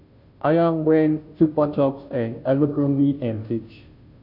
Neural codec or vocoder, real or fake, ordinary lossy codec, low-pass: codec, 16 kHz, 0.5 kbps, FunCodec, trained on Chinese and English, 25 frames a second; fake; none; 5.4 kHz